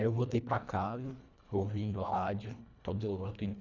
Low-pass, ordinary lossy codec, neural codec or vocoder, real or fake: 7.2 kHz; none; codec, 24 kHz, 1.5 kbps, HILCodec; fake